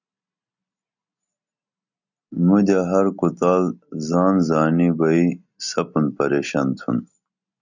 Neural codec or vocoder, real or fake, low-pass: none; real; 7.2 kHz